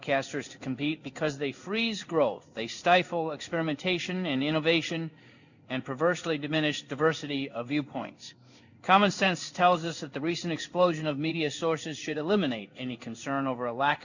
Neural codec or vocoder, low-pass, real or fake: codec, 16 kHz in and 24 kHz out, 1 kbps, XY-Tokenizer; 7.2 kHz; fake